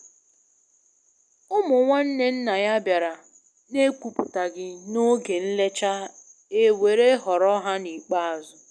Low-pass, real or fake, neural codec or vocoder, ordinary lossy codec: none; real; none; none